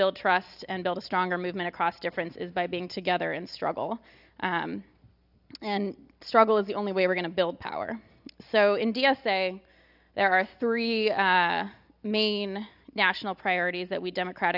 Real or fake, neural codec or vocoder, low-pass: real; none; 5.4 kHz